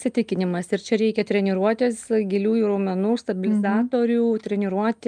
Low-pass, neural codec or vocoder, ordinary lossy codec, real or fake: 9.9 kHz; none; Opus, 32 kbps; real